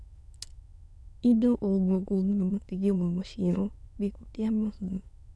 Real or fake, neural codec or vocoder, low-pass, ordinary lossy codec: fake; autoencoder, 22.05 kHz, a latent of 192 numbers a frame, VITS, trained on many speakers; none; none